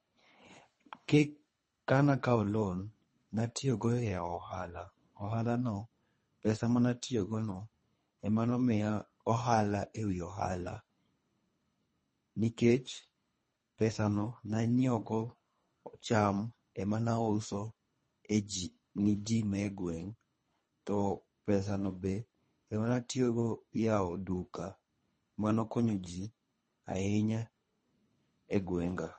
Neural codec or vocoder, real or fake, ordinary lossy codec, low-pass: codec, 24 kHz, 3 kbps, HILCodec; fake; MP3, 32 kbps; 10.8 kHz